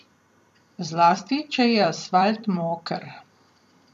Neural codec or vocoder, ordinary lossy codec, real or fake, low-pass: vocoder, 44.1 kHz, 128 mel bands every 256 samples, BigVGAN v2; none; fake; 14.4 kHz